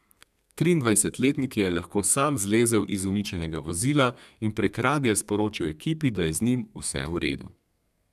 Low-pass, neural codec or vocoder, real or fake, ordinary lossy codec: 14.4 kHz; codec, 32 kHz, 1.9 kbps, SNAC; fake; none